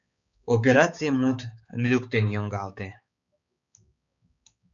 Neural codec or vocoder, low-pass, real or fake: codec, 16 kHz, 4 kbps, X-Codec, HuBERT features, trained on balanced general audio; 7.2 kHz; fake